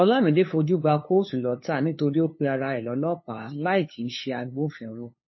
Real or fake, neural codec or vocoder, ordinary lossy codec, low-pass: fake; codec, 16 kHz, 2 kbps, FunCodec, trained on LibriTTS, 25 frames a second; MP3, 24 kbps; 7.2 kHz